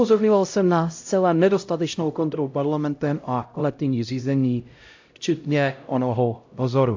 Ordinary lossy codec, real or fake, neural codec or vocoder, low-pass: AAC, 48 kbps; fake; codec, 16 kHz, 0.5 kbps, X-Codec, HuBERT features, trained on LibriSpeech; 7.2 kHz